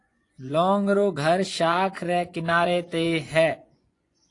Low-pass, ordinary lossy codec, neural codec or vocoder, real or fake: 10.8 kHz; AAC, 48 kbps; vocoder, 24 kHz, 100 mel bands, Vocos; fake